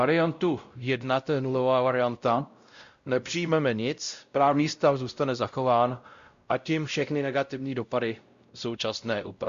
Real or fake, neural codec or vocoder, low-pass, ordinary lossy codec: fake; codec, 16 kHz, 0.5 kbps, X-Codec, WavLM features, trained on Multilingual LibriSpeech; 7.2 kHz; Opus, 64 kbps